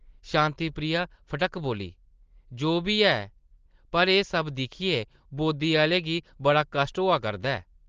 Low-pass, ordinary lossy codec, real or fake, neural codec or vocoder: 7.2 kHz; Opus, 16 kbps; real; none